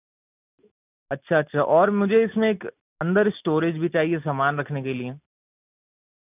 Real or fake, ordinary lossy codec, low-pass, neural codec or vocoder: real; none; 3.6 kHz; none